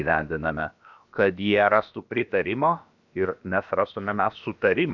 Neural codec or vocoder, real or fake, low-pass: codec, 16 kHz, about 1 kbps, DyCAST, with the encoder's durations; fake; 7.2 kHz